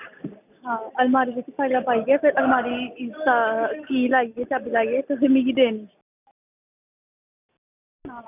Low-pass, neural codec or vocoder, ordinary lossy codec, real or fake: 3.6 kHz; none; none; real